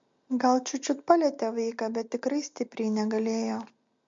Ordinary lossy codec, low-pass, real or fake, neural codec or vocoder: MP3, 48 kbps; 7.2 kHz; real; none